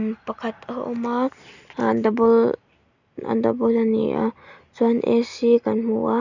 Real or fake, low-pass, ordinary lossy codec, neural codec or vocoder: real; 7.2 kHz; none; none